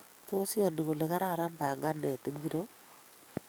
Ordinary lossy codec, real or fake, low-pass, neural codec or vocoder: none; fake; none; codec, 44.1 kHz, 7.8 kbps, DAC